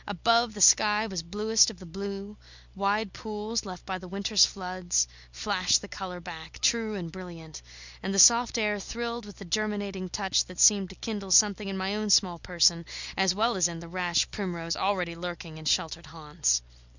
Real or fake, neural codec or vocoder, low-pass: real; none; 7.2 kHz